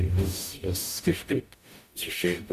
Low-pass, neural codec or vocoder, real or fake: 14.4 kHz; codec, 44.1 kHz, 0.9 kbps, DAC; fake